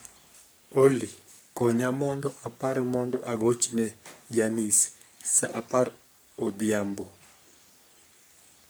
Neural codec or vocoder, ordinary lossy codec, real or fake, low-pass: codec, 44.1 kHz, 3.4 kbps, Pupu-Codec; none; fake; none